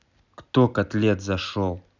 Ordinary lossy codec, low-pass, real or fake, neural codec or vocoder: none; 7.2 kHz; real; none